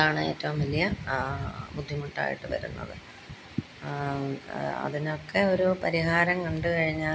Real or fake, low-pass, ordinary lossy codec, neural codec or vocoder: real; none; none; none